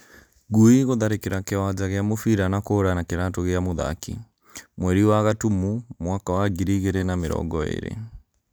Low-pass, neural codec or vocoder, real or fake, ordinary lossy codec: none; none; real; none